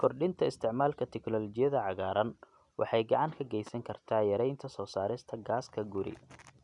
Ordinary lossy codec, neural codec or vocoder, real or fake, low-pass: none; none; real; 10.8 kHz